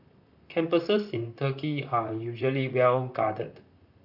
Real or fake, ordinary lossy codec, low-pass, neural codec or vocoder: fake; none; 5.4 kHz; vocoder, 44.1 kHz, 128 mel bands, Pupu-Vocoder